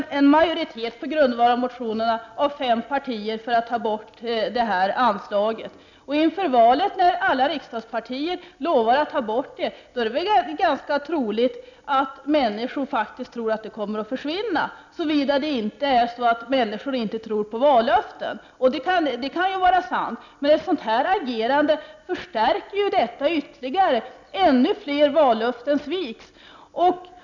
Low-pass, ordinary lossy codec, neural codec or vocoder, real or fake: 7.2 kHz; none; none; real